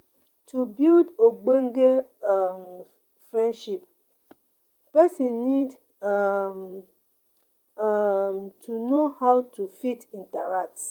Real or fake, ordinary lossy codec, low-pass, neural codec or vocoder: fake; Opus, 32 kbps; 19.8 kHz; vocoder, 44.1 kHz, 128 mel bands, Pupu-Vocoder